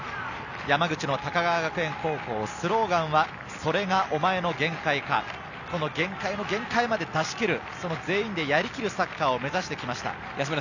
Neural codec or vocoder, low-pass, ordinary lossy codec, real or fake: none; 7.2 kHz; none; real